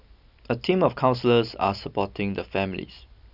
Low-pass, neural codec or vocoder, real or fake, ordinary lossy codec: 5.4 kHz; none; real; none